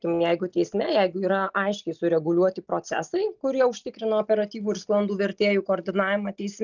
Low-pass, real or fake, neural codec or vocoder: 7.2 kHz; real; none